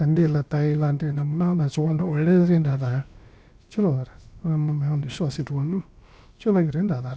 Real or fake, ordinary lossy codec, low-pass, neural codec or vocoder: fake; none; none; codec, 16 kHz, about 1 kbps, DyCAST, with the encoder's durations